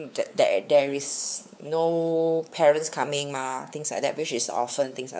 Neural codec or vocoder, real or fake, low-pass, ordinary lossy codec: codec, 16 kHz, 4 kbps, X-Codec, WavLM features, trained on Multilingual LibriSpeech; fake; none; none